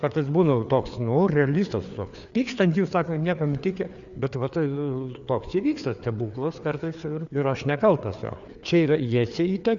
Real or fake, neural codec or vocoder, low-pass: fake; codec, 16 kHz, 4 kbps, FreqCodec, larger model; 7.2 kHz